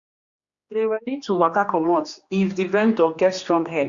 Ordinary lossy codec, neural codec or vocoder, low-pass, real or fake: Opus, 64 kbps; codec, 16 kHz, 2 kbps, X-Codec, HuBERT features, trained on general audio; 7.2 kHz; fake